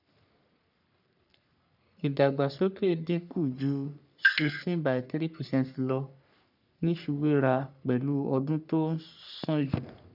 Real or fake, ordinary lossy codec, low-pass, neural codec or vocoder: fake; none; 5.4 kHz; codec, 44.1 kHz, 3.4 kbps, Pupu-Codec